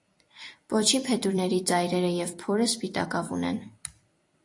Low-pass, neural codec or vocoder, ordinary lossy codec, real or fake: 10.8 kHz; vocoder, 44.1 kHz, 128 mel bands every 256 samples, BigVGAN v2; AAC, 48 kbps; fake